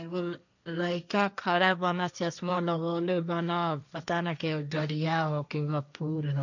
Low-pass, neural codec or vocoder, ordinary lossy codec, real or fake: 7.2 kHz; codec, 16 kHz, 1.1 kbps, Voila-Tokenizer; none; fake